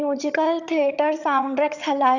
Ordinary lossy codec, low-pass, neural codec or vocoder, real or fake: none; 7.2 kHz; vocoder, 22.05 kHz, 80 mel bands, HiFi-GAN; fake